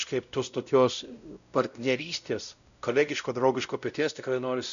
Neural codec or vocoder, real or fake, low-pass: codec, 16 kHz, 0.5 kbps, X-Codec, WavLM features, trained on Multilingual LibriSpeech; fake; 7.2 kHz